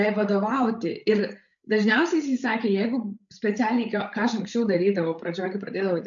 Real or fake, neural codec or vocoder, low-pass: fake; codec, 16 kHz, 8 kbps, FreqCodec, larger model; 7.2 kHz